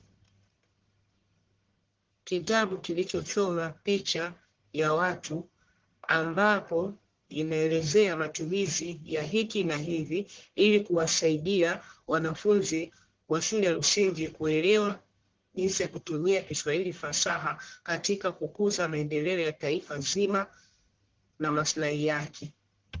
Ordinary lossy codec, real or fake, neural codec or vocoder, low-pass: Opus, 16 kbps; fake; codec, 44.1 kHz, 1.7 kbps, Pupu-Codec; 7.2 kHz